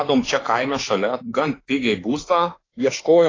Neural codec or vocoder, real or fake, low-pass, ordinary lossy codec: autoencoder, 48 kHz, 32 numbers a frame, DAC-VAE, trained on Japanese speech; fake; 7.2 kHz; AAC, 32 kbps